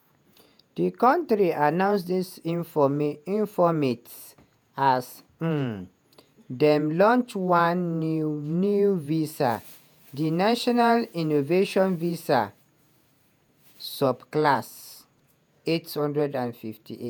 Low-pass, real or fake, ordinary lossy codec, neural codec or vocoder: none; fake; none; vocoder, 48 kHz, 128 mel bands, Vocos